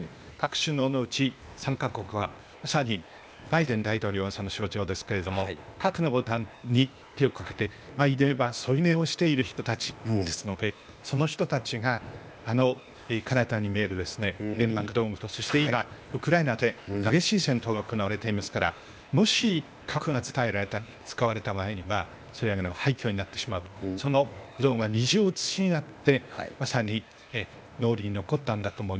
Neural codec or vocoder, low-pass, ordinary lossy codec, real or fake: codec, 16 kHz, 0.8 kbps, ZipCodec; none; none; fake